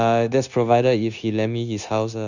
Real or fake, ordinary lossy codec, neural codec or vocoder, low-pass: fake; none; codec, 16 kHz, 0.9 kbps, LongCat-Audio-Codec; 7.2 kHz